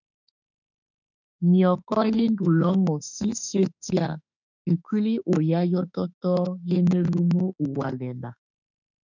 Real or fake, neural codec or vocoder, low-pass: fake; autoencoder, 48 kHz, 32 numbers a frame, DAC-VAE, trained on Japanese speech; 7.2 kHz